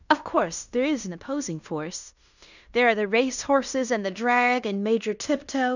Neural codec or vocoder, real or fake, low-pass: codec, 16 kHz in and 24 kHz out, 0.9 kbps, LongCat-Audio-Codec, fine tuned four codebook decoder; fake; 7.2 kHz